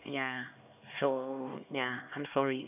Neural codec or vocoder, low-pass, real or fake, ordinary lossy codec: codec, 16 kHz, 2 kbps, X-Codec, HuBERT features, trained on LibriSpeech; 3.6 kHz; fake; none